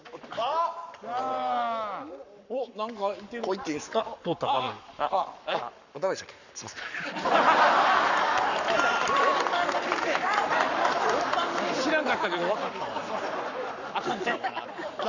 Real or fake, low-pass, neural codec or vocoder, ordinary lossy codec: fake; 7.2 kHz; codec, 44.1 kHz, 7.8 kbps, Pupu-Codec; none